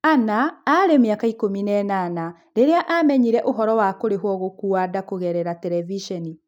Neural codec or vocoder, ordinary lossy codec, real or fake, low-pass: none; none; real; 19.8 kHz